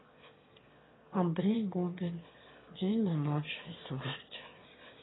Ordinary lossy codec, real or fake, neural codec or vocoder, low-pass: AAC, 16 kbps; fake; autoencoder, 22.05 kHz, a latent of 192 numbers a frame, VITS, trained on one speaker; 7.2 kHz